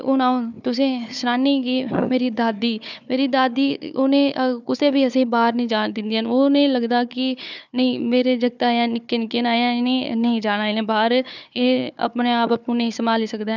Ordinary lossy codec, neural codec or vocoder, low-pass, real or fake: none; codec, 16 kHz, 4 kbps, FunCodec, trained on Chinese and English, 50 frames a second; 7.2 kHz; fake